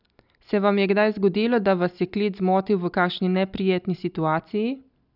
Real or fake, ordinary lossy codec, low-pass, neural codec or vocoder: real; none; 5.4 kHz; none